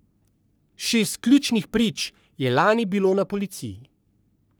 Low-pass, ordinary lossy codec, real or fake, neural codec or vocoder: none; none; fake; codec, 44.1 kHz, 3.4 kbps, Pupu-Codec